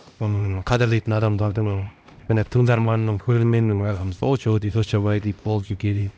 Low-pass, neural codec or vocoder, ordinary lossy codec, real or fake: none; codec, 16 kHz, 1 kbps, X-Codec, HuBERT features, trained on LibriSpeech; none; fake